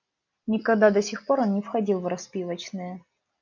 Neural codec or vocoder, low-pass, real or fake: none; 7.2 kHz; real